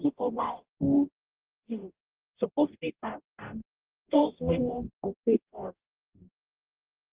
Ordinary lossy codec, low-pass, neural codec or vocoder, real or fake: Opus, 16 kbps; 3.6 kHz; codec, 44.1 kHz, 0.9 kbps, DAC; fake